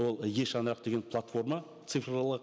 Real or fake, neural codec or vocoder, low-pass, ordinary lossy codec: real; none; none; none